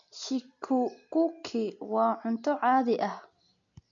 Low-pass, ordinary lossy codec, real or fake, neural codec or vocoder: 7.2 kHz; none; real; none